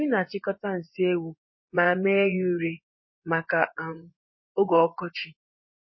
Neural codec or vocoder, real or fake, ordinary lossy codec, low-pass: vocoder, 24 kHz, 100 mel bands, Vocos; fake; MP3, 24 kbps; 7.2 kHz